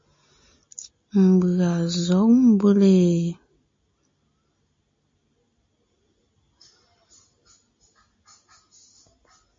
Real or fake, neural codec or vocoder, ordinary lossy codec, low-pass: real; none; MP3, 32 kbps; 7.2 kHz